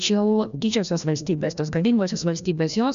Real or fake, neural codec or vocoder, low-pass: fake; codec, 16 kHz, 1 kbps, FreqCodec, larger model; 7.2 kHz